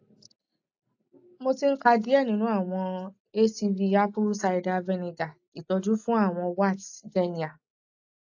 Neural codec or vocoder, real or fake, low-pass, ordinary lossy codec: none; real; 7.2 kHz; AAC, 48 kbps